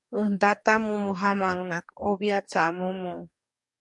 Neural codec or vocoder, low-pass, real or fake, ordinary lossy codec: codec, 44.1 kHz, 2.6 kbps, DAC; 10.8 kHz; fake; MP3, 64 kbps